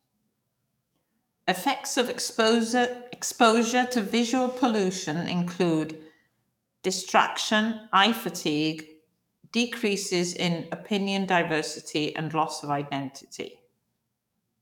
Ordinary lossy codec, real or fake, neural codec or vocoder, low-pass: none; fake; codec, 44.1 kHz, 7.8 kbps, DAC; 19.8 kHz